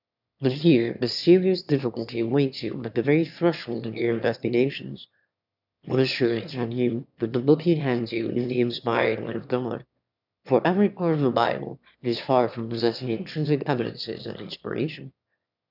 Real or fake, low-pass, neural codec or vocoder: fake; 5.4 kHz; autoencoder, 22.05 kHz, a latent of 192 numbers a frame, VITS, trained on one speaker